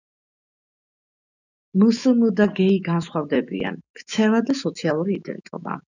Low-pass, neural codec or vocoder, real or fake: 7.2 kHz; autoencoder, 48 kHz, 128 numbers a frame, DAC-VAE, trained on Japanese speech; fake